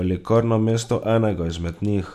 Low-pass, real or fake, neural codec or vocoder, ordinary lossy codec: 14.4 kHz; real; none; none